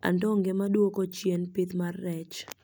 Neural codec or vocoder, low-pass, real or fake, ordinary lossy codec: none; none; real; none